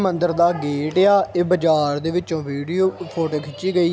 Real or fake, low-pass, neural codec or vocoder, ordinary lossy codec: real; none; none; none